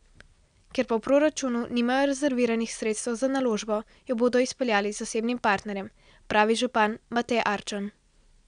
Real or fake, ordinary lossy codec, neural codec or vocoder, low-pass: real; none; none; 9.9 kHz